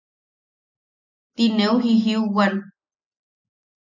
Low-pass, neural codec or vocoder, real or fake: 7.2 kHz; none; real